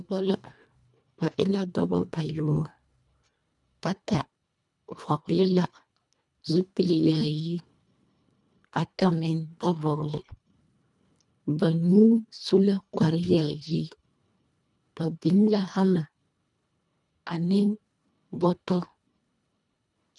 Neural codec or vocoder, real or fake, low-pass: codec, 24 kHz, 1.5 kbps, HILCodec; fake; 10.8 kHz